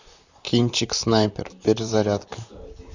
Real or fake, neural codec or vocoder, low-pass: fake; vocoder, 44.1 kHz, 128 mel bands, Pupu-Vocoder; 7.2 kHz